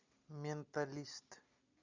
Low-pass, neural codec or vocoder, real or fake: 7.2 kHz; none; real